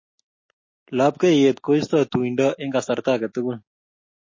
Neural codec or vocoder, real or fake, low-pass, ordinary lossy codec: none; real; 7.2 kHz; MP3, 32 kbps